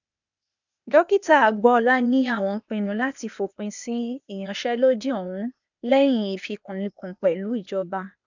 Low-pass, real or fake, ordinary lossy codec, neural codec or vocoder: 7.2 kHz; fake; none; codec, 16 kHz, 0.8 kbps, ZipCodec